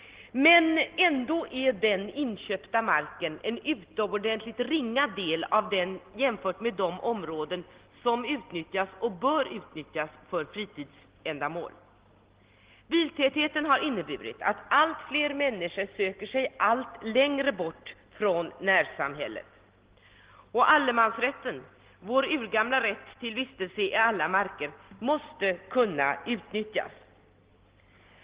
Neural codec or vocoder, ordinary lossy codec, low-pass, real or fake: none; Opus, 16 kbps; 3.6 kHz; real